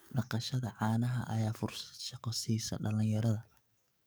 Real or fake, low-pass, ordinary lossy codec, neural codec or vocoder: fake; none; none; codec, 44.1 kHz, 7.8 kbps, DAC